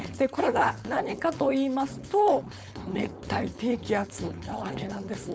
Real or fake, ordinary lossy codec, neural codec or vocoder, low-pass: fake; none; codec, 16 kHz, 4.8 kbps, FACodec; none